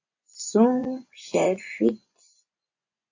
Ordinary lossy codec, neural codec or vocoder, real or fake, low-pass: AAC, 48 kbps; vocoder, 24 kHz, 100 mel bands, Vocos; fake; 7.2 kHz